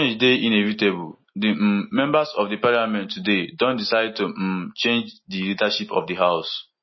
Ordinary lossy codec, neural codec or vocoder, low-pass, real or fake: MP3, 24 kbps; none; 7.2 kHz; real